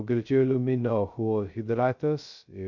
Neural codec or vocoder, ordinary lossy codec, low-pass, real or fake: codec, 16 kHz, 0.2 kbps, FocalCodec; Opus, 64 kbps; 7.2 kHz; fake